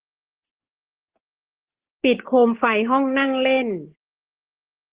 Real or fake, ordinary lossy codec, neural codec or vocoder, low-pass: fake; Opus, 16 kbps; codec, 16 kHz, 6 kbps, DAC; 3.6 kHz